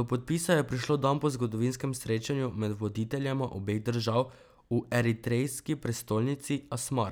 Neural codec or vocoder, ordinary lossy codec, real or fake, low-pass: none; none; real; none